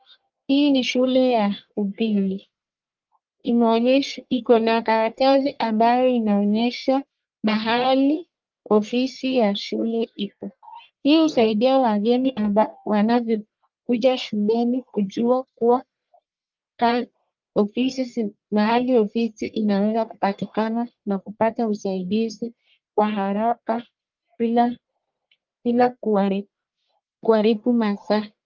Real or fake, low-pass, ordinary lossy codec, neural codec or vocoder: fake; 7.2 kHz; Opus, 24 kbps; codec, 44.1 kHz, 1.7 kbps, Pupu-Codec